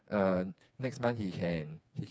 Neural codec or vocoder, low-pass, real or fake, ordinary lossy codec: codec, 16 kHz, 4 kbps, FreqCodec, smaller model; none; fake; none